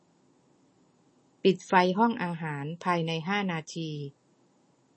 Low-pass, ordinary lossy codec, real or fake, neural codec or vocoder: 10.8 kHz; MP3, 32 kbps; real; none